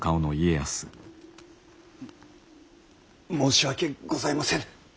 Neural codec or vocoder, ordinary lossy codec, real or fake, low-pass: none; none; real; none